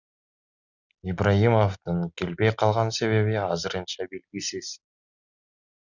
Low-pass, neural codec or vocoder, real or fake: 7.2 kHz; none; real